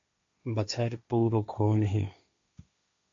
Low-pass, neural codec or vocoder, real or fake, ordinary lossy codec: 7.2 kHz; codec, 16 kHz, 1.1 kbps, Voila-Tokenizer; fake; MP3, 48 kbps